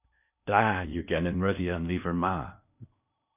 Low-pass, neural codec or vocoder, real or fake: 3.6 kHz; codec, 16 kHz in and 24 kHz out, 0.8 kbps, FocalCodec, streaming, 65536 codes; fake